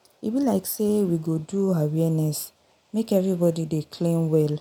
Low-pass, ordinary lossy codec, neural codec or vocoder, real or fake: none; none; none; real